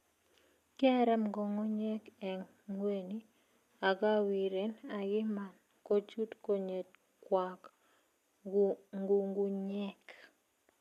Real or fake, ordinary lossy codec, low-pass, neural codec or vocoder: real; none; 14.4 kHz; none